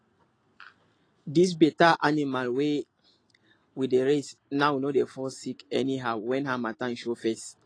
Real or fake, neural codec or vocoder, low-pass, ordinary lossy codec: real; none; 9.9 kHz; AAC, 32 kbps